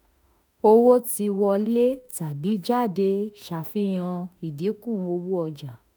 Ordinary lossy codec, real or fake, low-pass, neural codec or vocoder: none; fake; none; autoencoder, 48 kHz, 32 numbers a frame, DAC-VAE, trained on Japanese speech